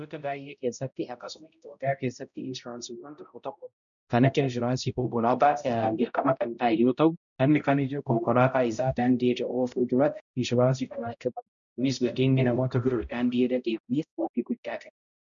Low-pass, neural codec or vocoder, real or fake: 7.2 kHz; codec, 16 kHz, 0.5 kbps, X-Codec, HuBERT features, trained on balanced general audio; fake